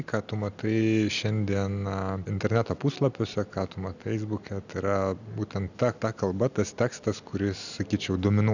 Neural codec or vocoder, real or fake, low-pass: none; real; 7.2 kHz